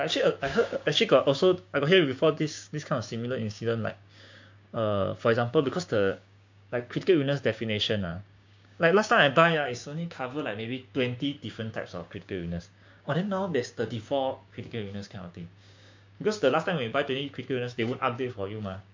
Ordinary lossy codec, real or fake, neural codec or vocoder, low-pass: MP3, 48 kbps; fake; codec, 16 kHz, 6 kbps, DAC; 7.2 kHz